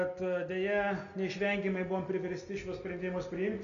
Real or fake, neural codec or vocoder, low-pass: real; none; 7.2 kHz